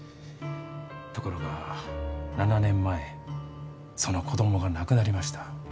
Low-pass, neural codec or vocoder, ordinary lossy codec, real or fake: none; none; none; real